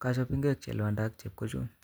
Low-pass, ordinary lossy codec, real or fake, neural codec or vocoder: none; none; real; none